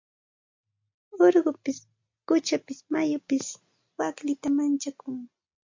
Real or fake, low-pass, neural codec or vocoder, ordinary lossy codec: real; 7.2 kHz; none; MP3, 48 kbps